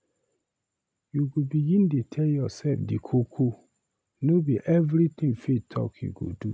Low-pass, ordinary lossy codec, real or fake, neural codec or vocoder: none; none; real; none